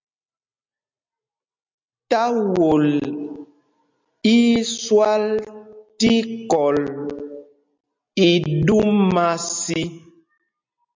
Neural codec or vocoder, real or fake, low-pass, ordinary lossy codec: none; real; 7.2 kHz; MP3, 64 kbps